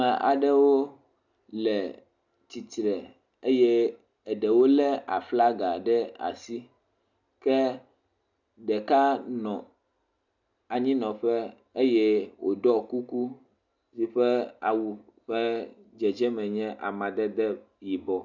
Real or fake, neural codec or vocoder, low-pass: real; none; 7.2 kHz